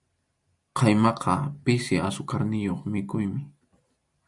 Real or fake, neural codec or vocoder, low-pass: real; none; 10.8 kHz